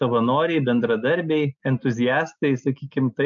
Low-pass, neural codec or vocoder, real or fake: 7.2 kHz; none; real